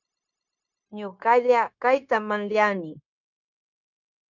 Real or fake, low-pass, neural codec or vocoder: fake; 7.2 kHz; codec, 16 kHz, 0.9 kbps, LongCat-Audio-Codec